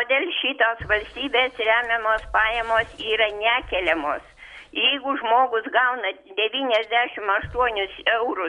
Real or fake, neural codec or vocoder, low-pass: real; none; 10.8 kHz